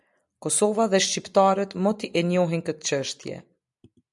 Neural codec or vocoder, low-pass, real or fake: none; 10.8 kHz; real